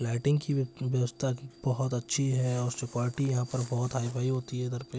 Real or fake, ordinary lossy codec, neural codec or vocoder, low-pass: real; none; none; none